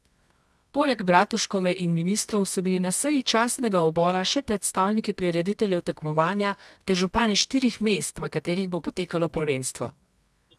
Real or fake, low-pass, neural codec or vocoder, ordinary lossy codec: fake; none; codec, 24 kHz, 0.9 kbps, WavTokenizer, medium music audio release; none